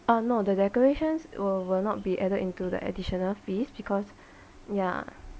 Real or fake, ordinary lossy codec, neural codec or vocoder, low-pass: real; none; none; none